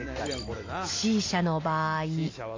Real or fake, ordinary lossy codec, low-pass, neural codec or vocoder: real; AAC, 32 kbps; 7.2 kHz; none